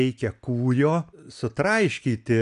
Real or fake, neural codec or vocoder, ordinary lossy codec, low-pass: real; none; AAC, 96 kbps; 10.8 kHz